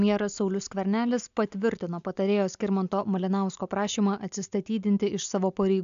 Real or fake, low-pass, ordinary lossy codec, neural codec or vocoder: real; 7.2 kHz; AAC, 96 kbps; none